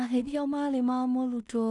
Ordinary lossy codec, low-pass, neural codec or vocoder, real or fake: none; 10.8 kHz; codec, 16 kHz in and 24 kHz out, 0.4 kbps, LongCat-Audio-Codec, two codebook decoder; fake